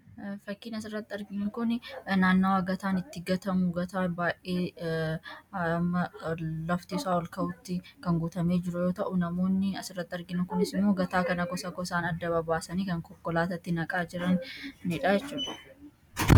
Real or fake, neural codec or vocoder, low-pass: real; none; 19.8 kHz